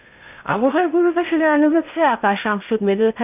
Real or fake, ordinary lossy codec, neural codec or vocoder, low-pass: fake; none; codec, 16 kHz in and 24 kHz out, 0.8 kbps, FocalCodec, streaming, 65536 codes; 3.6 kHz